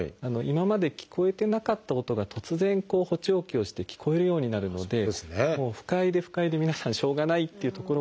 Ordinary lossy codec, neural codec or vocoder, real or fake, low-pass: none; none; real; none